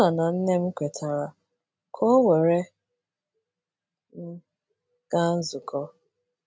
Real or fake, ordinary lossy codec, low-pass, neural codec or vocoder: real; none; none; none